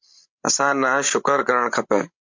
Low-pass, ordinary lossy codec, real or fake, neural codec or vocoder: 7.2 kHz; MP3, 64 kbps; fake; codec, 16 kHz, 16 kbps, FreqCodec, larger model